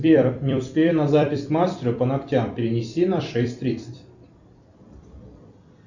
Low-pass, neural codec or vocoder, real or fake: 7.2 kHz; vocoder, 44.1 kHz, 128 mel bands every 256 samples, BigVGAN v2; fake